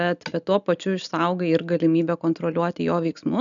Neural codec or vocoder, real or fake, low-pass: none; real; 7.2 kHz